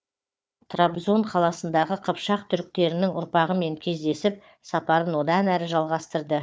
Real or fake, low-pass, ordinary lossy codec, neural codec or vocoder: fake; none; none; codec, 16 kHz, 4 kbps, FunCodec, trained on Chinese and English, 50 frames a second